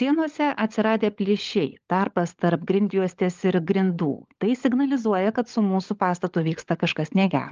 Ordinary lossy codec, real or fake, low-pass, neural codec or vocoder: Opus, 24 kbps; fake; 7.2 kHz; codec, 16 kHz, 16 kbps, FunCodec, trained on LibriTTS, 50 frames a second